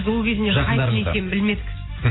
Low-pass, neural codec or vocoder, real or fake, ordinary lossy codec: 7.2 kHz; none; real; AAC, 16 kbps